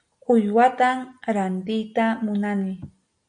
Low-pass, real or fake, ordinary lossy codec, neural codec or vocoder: 9.9 kHz; real; MP3, 64 kbps; none